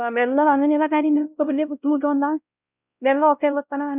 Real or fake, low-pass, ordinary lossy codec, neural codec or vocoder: fake; 3.6 kHz; none; codec, 16 kHz, 0.5 kbps, X-Codec, WavLM features, trained on Multilingual LibriSpeech